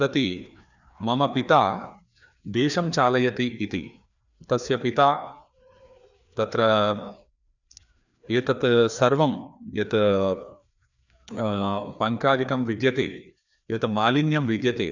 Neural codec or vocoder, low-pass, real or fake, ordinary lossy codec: codec, 16 kHz, 2 kbps, FreqCodec, larger model; 7.2 kHz; fake; none